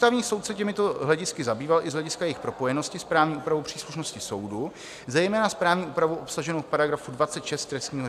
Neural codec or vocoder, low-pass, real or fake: none; 14.4 kHz; real